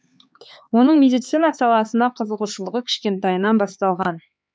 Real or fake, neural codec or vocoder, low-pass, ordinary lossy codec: fake; codec, 16 kHz, 4 kbps, X-Codec, HuBERT features, trained on LibriSpeech; none; none